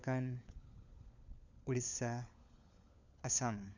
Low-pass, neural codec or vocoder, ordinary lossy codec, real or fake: 7.2 kHz; codec, 16 kHz, 4 kbps, FunCodec, trained on LibriTTS, 50 frames a second; none; fake